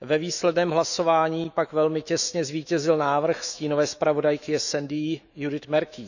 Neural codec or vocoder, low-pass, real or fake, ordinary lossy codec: autoencoder, 48 kHz, 128 numbers a frame, DAC-VAE, trained on Japanese speech; 7.2 kHz; fake; none